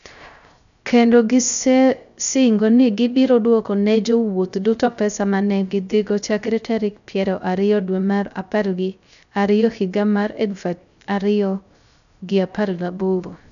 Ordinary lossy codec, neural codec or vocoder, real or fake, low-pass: none; codec, 16 kHz, 0.3 kbps, FocalCodec; fake; 7.2 kHz